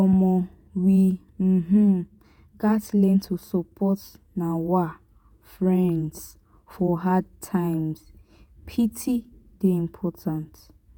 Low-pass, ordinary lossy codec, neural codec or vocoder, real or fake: none; none; vocoder, 48 kHz, 128 mel bands, Vocos; fake